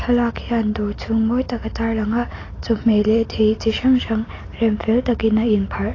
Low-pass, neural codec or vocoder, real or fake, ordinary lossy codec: 7.2 kHz; none; real; AAC, 32 kbps